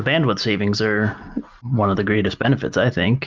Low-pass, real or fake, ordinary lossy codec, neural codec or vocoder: 7.2 kHz; real; Opus, 24 kbps; none